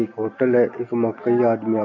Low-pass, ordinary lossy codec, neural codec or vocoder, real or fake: 7.2 kHz; none; none; real